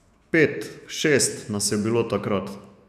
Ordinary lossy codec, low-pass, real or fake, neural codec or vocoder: none; 14.4 kHz; fake; autoencoder, 48 kHz, 128 numbers a frame, DAC-VAE, trained on Japanese speech